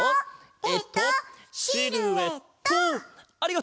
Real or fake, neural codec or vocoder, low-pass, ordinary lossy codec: real; none; none; none